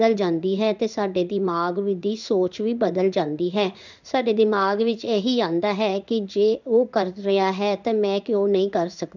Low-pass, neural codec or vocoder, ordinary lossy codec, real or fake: 7.2 kHz; codec, 16 kHz in and 24 kHz out, 1 kbps, XY-Tokenizer; none; fake